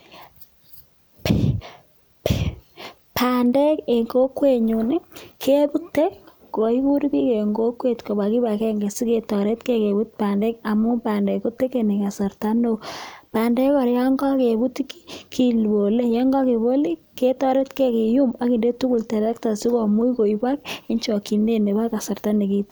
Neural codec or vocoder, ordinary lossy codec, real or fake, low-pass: none; none; real; none